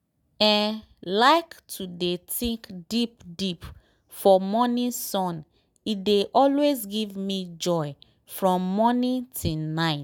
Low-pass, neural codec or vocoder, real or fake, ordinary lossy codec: none; none; real; none